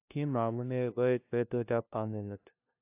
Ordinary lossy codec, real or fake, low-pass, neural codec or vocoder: AAC, 32 kbps; fake; 3.6 kHz; codec, 16 kHz, 0.5 kbps, FunCodec, trained on LibriTTS, 25 frames a second